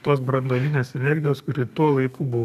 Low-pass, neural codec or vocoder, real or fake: 14.4 kHz; codec, 32 kHz, 1.9 kbps, SNAC; fake